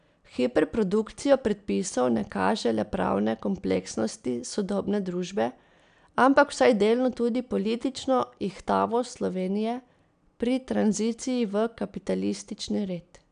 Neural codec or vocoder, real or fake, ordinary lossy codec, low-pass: none; real; none; 9.9 kHz